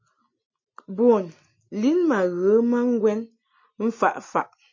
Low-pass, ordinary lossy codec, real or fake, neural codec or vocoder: 7.2 kHz; MP3, 32 kbps; real; none